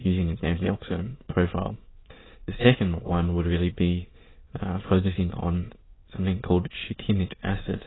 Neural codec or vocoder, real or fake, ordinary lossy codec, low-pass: autoencoder, 22.05 kHz, a latent of 192 numbers a frame, VITS, trained on many speakers; fake; AAC, 16 kbps; 7.2 kHz